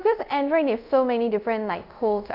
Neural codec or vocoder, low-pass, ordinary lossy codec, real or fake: codec, 24 kHz, 0.5 kbps, DualCodec; 5.4 kHz; none; fake